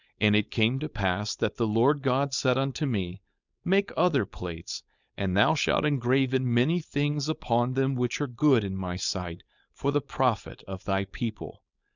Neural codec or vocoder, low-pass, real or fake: codec, 16 kHz, 4.8 kbps, FACodec; 7.2 kHz; fake